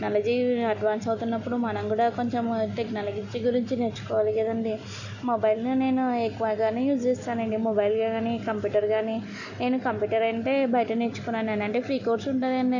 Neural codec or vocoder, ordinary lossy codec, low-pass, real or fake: none; none; 7.2 kHz; real